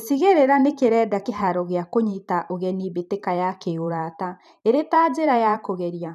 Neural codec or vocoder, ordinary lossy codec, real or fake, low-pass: vocoder, 44.1 kHz, 128 mel bands every 512 samples, BigVGAN v2; none; fake; 14.4 kHz